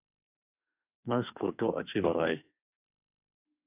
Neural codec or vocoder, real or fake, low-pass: autoencoder, 48 kHz, 32 numbers a frame, DAC-VAE, trained on Japanese speech; fake; 3.6 kHz